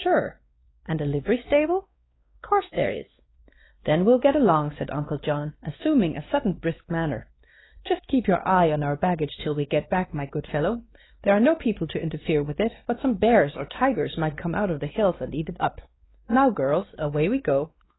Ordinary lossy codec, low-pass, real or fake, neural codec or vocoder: AAC, 16 kbps; 7.2 kHz; fake; codec, 16 kHz, 2 kbps, X-Codec, WavLM features, trained on Multilingual LibriSpeech